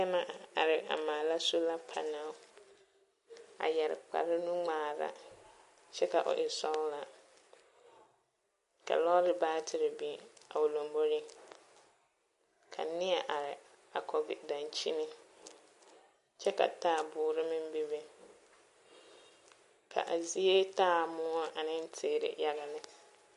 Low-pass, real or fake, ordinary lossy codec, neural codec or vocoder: 14.4 kHz; real; MP3, 48 kbps; none